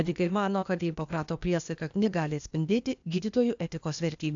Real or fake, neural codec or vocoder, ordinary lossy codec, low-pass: fake; codec, 16 kHz, 0.8 kbps, ZipCodec; AAC, 64 kbps; 7.2 kHz